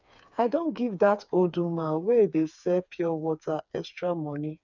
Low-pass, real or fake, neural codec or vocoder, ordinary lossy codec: 7.2 kHz; fake; codec, 16 kHz, 4 kbps, FreqCodec, smaller model; none